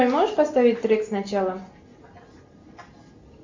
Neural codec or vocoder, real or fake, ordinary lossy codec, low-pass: none; real; MP3, 64 kbps; 7.2 kHz